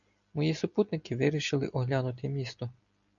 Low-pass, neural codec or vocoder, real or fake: 7.2 kHz; none; real